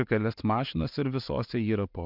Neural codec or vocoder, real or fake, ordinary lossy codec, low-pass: none; real; MP3, 48 kbps; 5.4 kHz